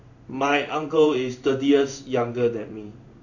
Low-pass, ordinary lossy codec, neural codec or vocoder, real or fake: 7.2 kHz; none; codec, 16 kHz in and 24 kHz out, 1 kbps, XY-Tokenizer; fake